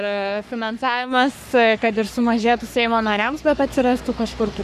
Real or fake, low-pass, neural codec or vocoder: fake; 14.4 kHz; codec, 44.1 kHz, 3.4 kbps, Pupu-Codec